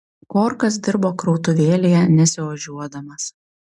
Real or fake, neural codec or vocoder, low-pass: real; none; 10.8 kHz